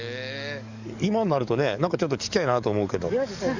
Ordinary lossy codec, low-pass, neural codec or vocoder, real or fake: Opus, 64 kbps; 7.2 kHz; codec, 44.1 kHz, 7.8 kbps, DAC; fake